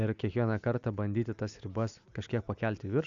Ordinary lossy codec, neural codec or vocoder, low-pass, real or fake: MP3, 96 kbps; none; 7.2 kHz; real